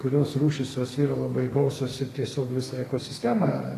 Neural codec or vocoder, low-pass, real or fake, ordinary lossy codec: codec, 32 kHz, 1.9 kbps, SNAC; 14.4 kHz; fake; AAC, 48 kbps